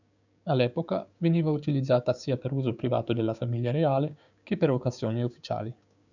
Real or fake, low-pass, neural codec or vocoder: fake; 7.2 kHz; codec, 16 kHz, 6 kbps, DAC